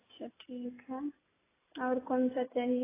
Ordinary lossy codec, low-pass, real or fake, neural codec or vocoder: AAC, 16 kbps; 3.6 kHz; real; none